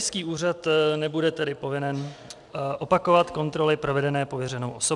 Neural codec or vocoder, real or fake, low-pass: none; real; 10.8 kHz